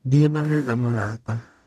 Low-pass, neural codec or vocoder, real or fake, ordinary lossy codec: 14.4 kHz; codec, 44.1 kHz, 0.9 kbps, DAC; fake; none